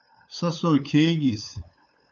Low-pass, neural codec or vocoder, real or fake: 7.2 kHz; codec, 16 kHz, 8 kbps, FunCodec, trained on LibriTTS, 25 frames a second; fake